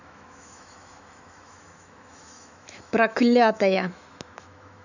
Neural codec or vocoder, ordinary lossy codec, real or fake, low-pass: autoencoder, 48 kHz, 128 numbers a frame, DAC-VAE, trained on Japanese speech; none; fake; 7.2 kHz